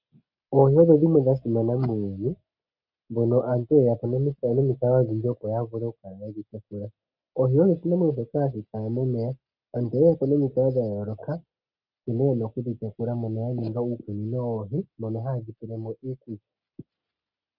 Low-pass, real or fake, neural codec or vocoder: 5.4 kHz; real; none